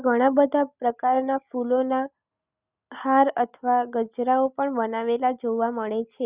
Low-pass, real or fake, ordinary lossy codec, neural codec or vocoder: 3.6 kHz; real; Opus, 64 kbps; none